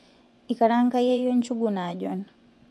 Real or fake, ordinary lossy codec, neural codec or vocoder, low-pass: fake; none; vocoder, 24 kHz, 100 mel bands, Vocos; none